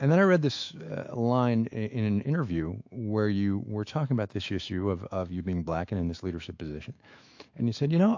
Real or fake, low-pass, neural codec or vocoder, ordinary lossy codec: fake; 7.2 kHz; autoencoder, 48 kHz, 128 numbers a frame, DAC-VAE, trained on Japanese speech; AAC, 48 kbps